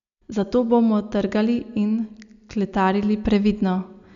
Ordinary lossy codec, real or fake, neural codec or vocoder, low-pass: none; real; none; 7.2 kHz